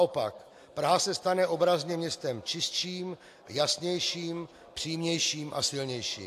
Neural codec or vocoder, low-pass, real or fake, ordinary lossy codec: none; 14.4 kHz; real; AAC, 64 kbps